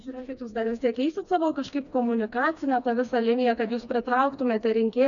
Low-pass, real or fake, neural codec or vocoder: 7.2 kHz; fake; codec, 16 kHz, 2 kbps, FreqCodec, smaller model